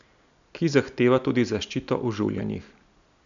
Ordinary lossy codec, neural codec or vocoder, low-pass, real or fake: none; none; 7.2 kHz; real